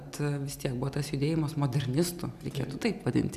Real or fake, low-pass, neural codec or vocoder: real; 14.4 kHz; none